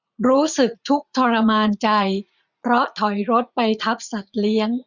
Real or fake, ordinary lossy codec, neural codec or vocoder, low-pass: fake; none; vocoder, 44.1 kHz, 80 mel bands, Vocos; 7.2 kHz